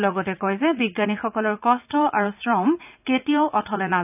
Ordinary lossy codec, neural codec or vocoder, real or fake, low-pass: none; vocoder, 44.1 kHz, 80 mel bands, Vocos; fake; 3.6 kHz